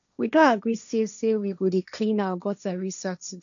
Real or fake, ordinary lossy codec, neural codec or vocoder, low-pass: fake; none; codec, 16 kHz, 1.1 kbps, Voila-Tokenizer; 7.2 kHz